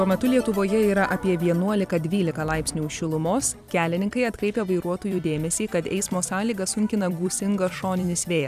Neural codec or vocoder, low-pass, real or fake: vocoder, 44.1 kHz, 128 mel bands every 256 samples, BigVGAN v2; 14.4 kHz; fake